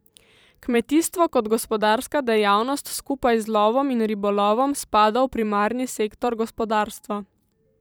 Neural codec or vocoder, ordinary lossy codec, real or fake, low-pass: vocoder, 44.1 kHz, 128 mel bands every 512 samples, BigVGAN v2; none; fake; none